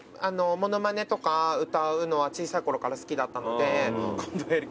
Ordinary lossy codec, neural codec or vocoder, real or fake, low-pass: none; none; real; none